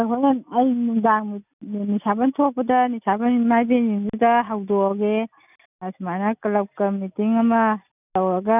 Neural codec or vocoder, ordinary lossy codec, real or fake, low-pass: none; none; real; 3.6 kHz